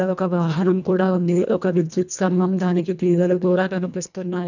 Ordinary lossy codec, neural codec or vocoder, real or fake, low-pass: none; codec, 24 kHz, 1.5 kbps, HILCodec; fake; 7.2 kHz